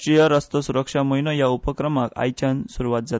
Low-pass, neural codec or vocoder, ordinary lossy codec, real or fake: none; none; none; real